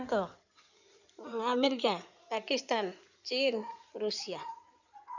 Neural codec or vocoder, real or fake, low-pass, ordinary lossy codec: codec, 16 kHz in and 24 kHz out, 2.2 kbps, FireRedTTS-2 codec; fake; 7.2 kHz; none